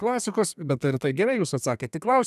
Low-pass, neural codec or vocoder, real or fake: 14.4 kHz; codec, 32 kHz, 1.9 kbps, SNAC; fake